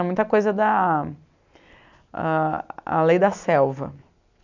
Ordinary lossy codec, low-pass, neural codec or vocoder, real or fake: none; 7.2 kHz; none; real